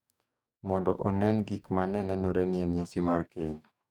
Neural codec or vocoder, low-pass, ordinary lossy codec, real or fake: codec, 44.1 kHz, 2.6 kbps, DAC; 19.8 kHz; none; fake